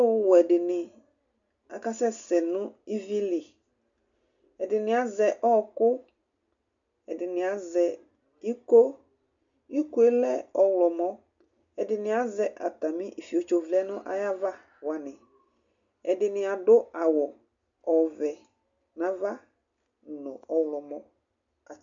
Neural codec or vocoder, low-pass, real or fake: none; 7.2 kHz; real